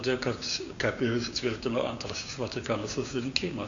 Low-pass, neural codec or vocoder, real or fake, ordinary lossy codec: 7.2 kHz; codec, 16 kHz, 6 kbps, DAC; fake; Opus, 64 kbps